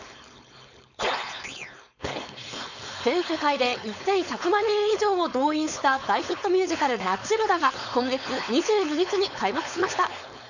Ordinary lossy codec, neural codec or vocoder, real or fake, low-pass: none; codec, 16 kHz, 4.8 kbps, FACodec; fake; 7.2 kHz